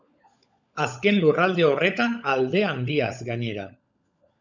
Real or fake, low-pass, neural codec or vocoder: fake; 7.2 kHz; codec, 16 kHz, 16 kbps, FunCodec, trained on LibriTTS, 50 frames a second